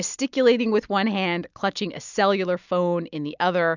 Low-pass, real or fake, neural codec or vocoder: 7.2 kHz; real; none